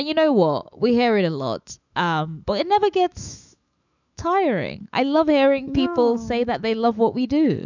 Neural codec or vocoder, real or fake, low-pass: autoencoder, 48 kHz, 128 numbers a frame, DAC-VAE, trained on Japanese speech; fake; 7.2 kHz